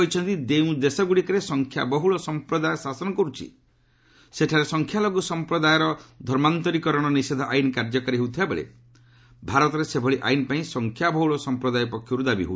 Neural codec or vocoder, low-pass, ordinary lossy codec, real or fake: none; none; none; real